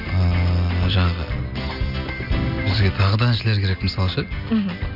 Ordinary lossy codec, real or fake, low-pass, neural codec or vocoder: none; real; 5.4 kHz; none